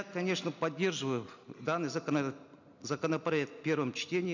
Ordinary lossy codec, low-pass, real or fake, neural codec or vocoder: none; 7.2 kHz; real; none